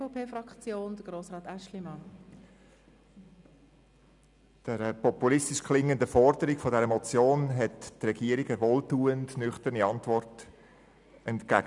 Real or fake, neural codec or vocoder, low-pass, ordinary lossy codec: real; none; 10.8 kHz; none